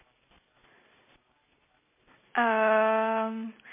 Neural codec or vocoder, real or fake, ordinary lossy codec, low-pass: none; real; MP3, 16 kbps; 3.6 kHz